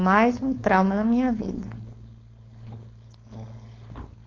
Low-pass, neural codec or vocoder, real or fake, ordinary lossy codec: 7.2 kHz; codec, 16 kHz, 4.8 kbps, FACodec; fake; AAC, 32 kbps